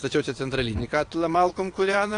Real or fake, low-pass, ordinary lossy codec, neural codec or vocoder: fake; 9.9 kHz; AAC, 48 kbps; vocoder, 22.05 kHz, 80 mel bands, WaveNeXt